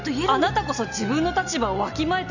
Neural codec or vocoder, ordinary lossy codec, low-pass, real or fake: none; none; 7.2 kHz; real